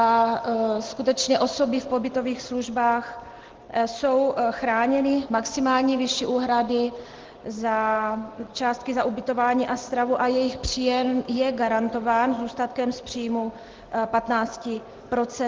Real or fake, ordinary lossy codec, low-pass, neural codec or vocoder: real; Opus, 16 kbps; 7.2 kHz; none